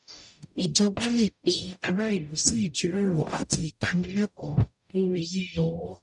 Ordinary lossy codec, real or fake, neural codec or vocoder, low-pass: none; fake; codec, 44.1 kHz, 0.9 kbps, DAC; 10.8 kHz